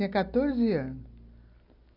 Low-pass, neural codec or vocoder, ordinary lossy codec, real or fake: 5.4 kHz; none; AAC, 32 kbps; real